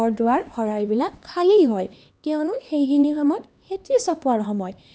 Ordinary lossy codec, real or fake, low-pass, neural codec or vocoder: none; fake; none; codec, 16 kHz, 2 kbps, X-Codec, HuBERT features, trained on LibriSpeech